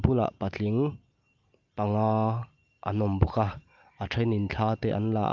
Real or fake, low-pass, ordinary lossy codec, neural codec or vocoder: real; 7.2 kHz; Opus, 24 kbps; none